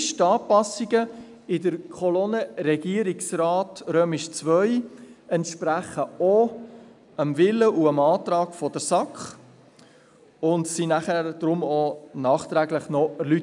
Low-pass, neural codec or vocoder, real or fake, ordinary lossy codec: 10.8 kHz; none; real; none